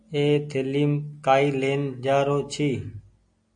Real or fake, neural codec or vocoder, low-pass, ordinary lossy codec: real; none; 9.9 kHz; AAC, 64 kbps